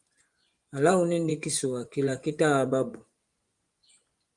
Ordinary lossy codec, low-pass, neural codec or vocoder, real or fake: Opus, 32 kbps; 10.8 kHz; vocoder, 24 kHz, 100 mel bands, Vocos; fake